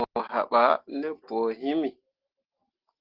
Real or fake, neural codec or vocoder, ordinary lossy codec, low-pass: real; none; Opus, 16 kbps; 5.4 kHz